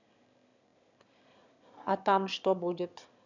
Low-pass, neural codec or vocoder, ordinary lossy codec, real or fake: 7.2 kHz; autoencoder, 22.05 kHz, a latent of 192 numbers a frame, VITS, trained on one speaker; none; fake